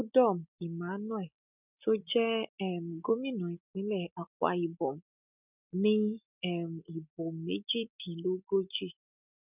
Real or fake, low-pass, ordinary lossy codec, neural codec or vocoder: real; 3.6 kHz; none; none